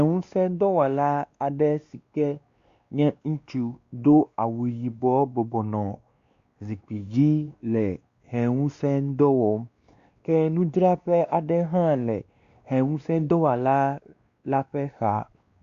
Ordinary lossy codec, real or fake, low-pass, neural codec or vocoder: Opus, 64 kbps; fake; 7.2 kHz; codec, 16 kHz, 2 kbps, X-Codec, WavLM features, trained on Multilingual LibriSpeech